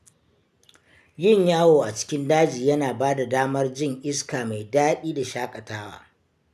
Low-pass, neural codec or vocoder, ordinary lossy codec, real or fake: 14.4 kHz; none; none; real